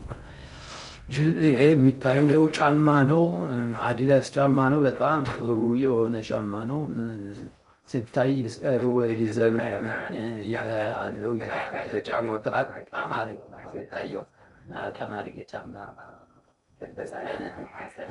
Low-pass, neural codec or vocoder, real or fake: 10.8 kHz; codec, 16 kHz in and 24 kHz out, 0.6 kbps, FocalCodec, streaming, 2048 codes; fake